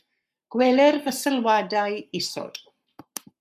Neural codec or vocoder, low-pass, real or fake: codec, 44.1 kHz, 7.8 kbps, Pupu-Codec; 14.4 kHz; fake